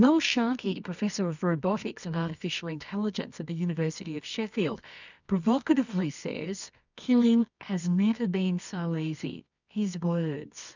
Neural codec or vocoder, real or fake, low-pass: codec, 24 kHz, 0.9 kbps, WavTokenizer, medium music audio release; fake; 7.2 kHz